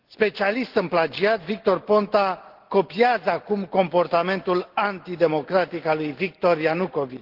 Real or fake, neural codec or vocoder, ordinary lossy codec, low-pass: real; none; Opus, 16 kbps; 5.4 kHz